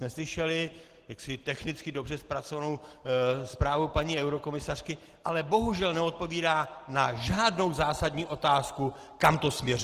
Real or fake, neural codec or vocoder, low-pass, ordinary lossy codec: real; none; 14.4 kHz; Opus, 16 kbps